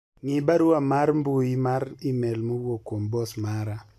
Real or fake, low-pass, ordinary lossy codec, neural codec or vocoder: real; 14.4 kHz; none; none